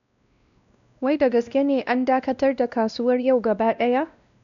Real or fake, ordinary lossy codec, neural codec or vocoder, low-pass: fake; none; codec, 16 kHz, 1 kbps, X-Codec, WavLM features, trained on Multilingual LibriSpeech; 7.2 kHz